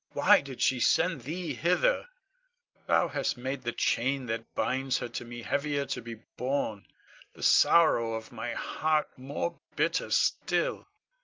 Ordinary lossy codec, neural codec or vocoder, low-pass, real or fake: Opus, 24 kbps; none; 7.2 kHz; real